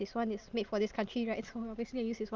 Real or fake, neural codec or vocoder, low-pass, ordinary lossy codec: real; none; 7.2 kHz; Opus, 32 kbps